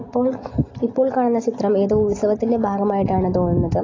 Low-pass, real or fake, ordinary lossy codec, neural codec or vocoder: 7.2 kHz; real; AAC, 32 kbps; none